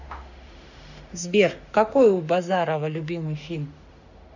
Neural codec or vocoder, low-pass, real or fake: autoencoder, 48 kHz, 32 numbers a frame, DAC-VAE, trained on Japanese speech; 7.2 kHz; fake